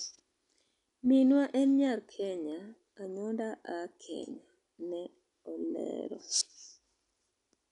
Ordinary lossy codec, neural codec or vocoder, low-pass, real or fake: none; none; 10.8 kHz; real